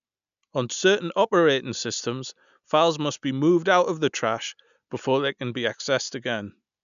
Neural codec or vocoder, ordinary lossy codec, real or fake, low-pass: none; none; real; 7.2 kHz